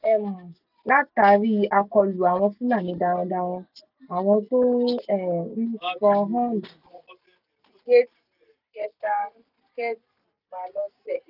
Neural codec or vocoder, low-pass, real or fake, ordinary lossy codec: none; 5.4 kHz; real; none